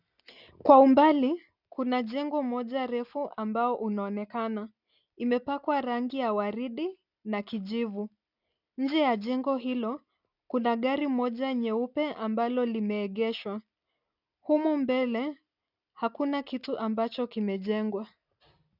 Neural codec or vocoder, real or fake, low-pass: none; real; 5.4 kHz